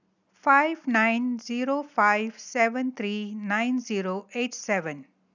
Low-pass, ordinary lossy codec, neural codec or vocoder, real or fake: 7.2 kHz; none; none; real